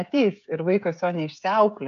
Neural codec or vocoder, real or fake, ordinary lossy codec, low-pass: codec, 16 kHz, 16 kbps, FreqCodec, smaller model; fake; AAC, 96 kbps; 7.2 kHz